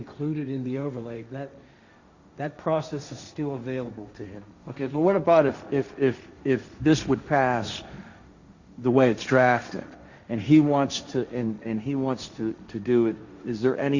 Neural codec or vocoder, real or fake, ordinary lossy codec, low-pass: codec, 16 kHz, 1.1 kbps, Voila-Tokenizer; fake; Opus, 64 kbps; 7.2 kHz